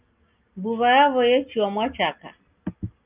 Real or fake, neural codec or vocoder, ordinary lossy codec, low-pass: real; none; Opus, 24 kbps; 3.6 kHz